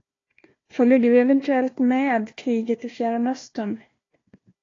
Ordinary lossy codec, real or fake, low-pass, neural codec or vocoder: AAC, 32 kbps; fake; 7.2 kHz; codec, 16 kHz, 1 kbps, FunCodec, trained on Chinese and English, 50 frames a second